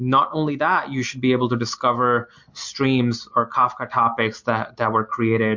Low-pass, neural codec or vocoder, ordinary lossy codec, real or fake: 7.2 kHz; none; MP3, 48 kbps; real